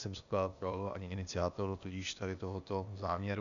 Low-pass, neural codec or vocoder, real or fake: 7.2 kHz; codec, 16 kHz, 0.8 kbps, ZipCodec; fake